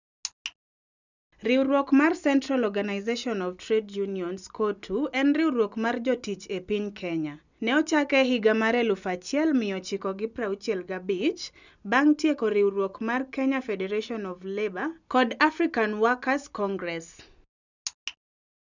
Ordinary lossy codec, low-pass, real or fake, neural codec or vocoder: none; 7.2 kHz; real; none